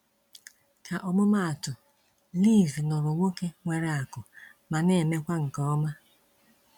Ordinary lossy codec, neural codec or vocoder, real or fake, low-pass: none; none; real; 19.8 kHz